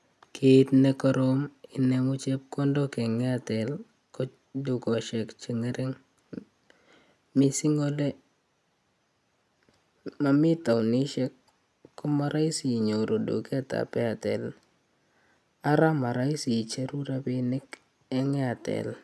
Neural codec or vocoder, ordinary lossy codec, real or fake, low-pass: none; none; real; none